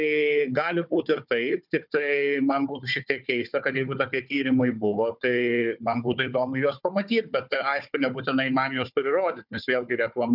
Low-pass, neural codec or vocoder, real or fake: 5.4 kHz; codec, 16 kHz, 4 kbps, FunCodec, trained on Chinese and English, 50 frames a second; fake